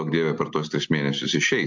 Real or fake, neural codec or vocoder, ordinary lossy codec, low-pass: real; none; MP3, 64 kbps; 7.2 kHz